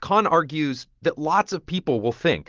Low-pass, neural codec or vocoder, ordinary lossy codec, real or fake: 7.2 kHz; none; Opus, 16 kbps; real